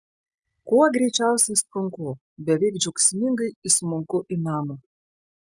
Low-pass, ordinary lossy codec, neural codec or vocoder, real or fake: 10.8 kHz; Opus, 64 kbps; none; real